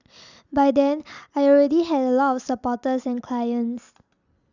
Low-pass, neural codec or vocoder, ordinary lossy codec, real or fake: 7.2 kHz; none; none; real